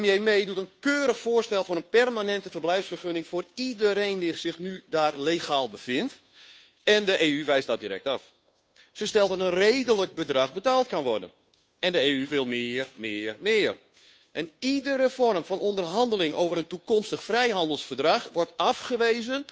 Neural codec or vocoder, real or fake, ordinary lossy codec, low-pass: codec, 16 kHz, 2 kbps, FunCodec, trained on Chinese and English, 25 frames a second; fake; none; none